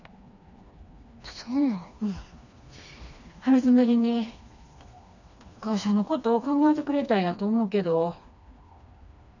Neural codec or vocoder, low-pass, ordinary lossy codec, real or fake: codec, 16 kHz, 2 kbps, FreqCodec, smaller model; 7.2 kHz; none; fake